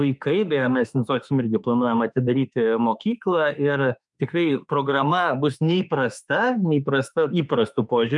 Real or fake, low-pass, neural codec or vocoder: fake; 10.8 kHz; autoencoder, 48 kHz, 32 numbers a frame, DAC-VAE, trained on Japanese speech